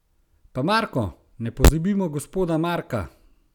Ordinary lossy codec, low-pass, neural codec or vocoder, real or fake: none; 19.8 kHz; none; real